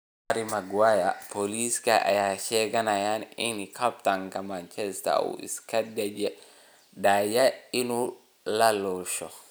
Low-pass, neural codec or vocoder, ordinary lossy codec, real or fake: none; none; none; real